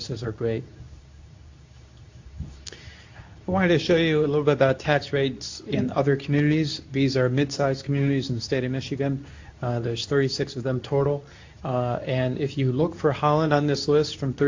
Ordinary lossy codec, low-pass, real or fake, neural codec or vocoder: AAC, 48 kbps; 7.2 kHz; fake; codec, 24 kHz, 0.9 kbps, WavTokenizer, medium speech release version 2